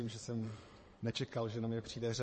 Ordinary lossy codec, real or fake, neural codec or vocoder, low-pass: MP3, 32 kbps; fake; vocoder, 22.05 kHz, 80 mel bands, Vocos; 9.9 kHz